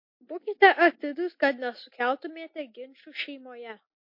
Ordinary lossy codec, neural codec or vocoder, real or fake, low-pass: MP3, 32 kbps; none; real; 5.4 kHz